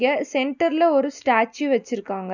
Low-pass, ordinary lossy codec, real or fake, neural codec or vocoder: 7.2 kHz; none; real; none